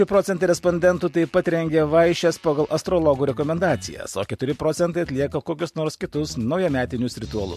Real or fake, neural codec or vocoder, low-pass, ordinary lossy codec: real; none; 14.4 kHz; MP3, 64 kbps